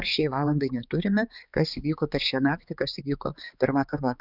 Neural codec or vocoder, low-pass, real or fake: codec, 16 kHz, 4 kbps, X-Codec, HuBERT features, trained on balanced general audio; 5.4 kHz; fake